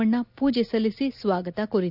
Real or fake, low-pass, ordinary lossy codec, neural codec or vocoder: real; 5.4 kHz; none; none